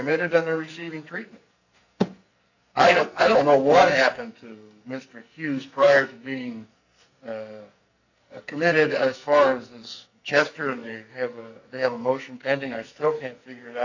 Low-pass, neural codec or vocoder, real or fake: 7.2 kHz; codec, 44.1 kHz, 2.6 kbps, SNAC; fake